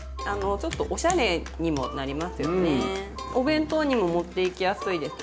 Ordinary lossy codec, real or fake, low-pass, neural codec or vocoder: none; real; none; none